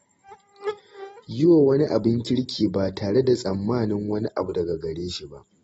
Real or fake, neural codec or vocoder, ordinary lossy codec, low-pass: real; none; AAC, 24 kbps; 9.9 kHz